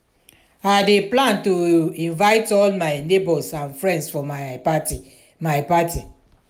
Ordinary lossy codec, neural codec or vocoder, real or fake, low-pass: none; none; real; 19.8 kHz